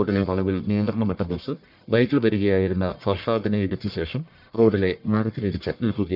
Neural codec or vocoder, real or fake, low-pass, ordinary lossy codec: codec, 44.1 kHz, 1.7 kbps, Pupu-Codec; fake; 5.4 kHz; none